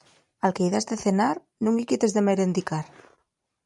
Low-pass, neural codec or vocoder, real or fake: 10.8 kHz; vocoder, 44.1 kHz, 128 mel bands every 512 samples, BigVGAN v2; fake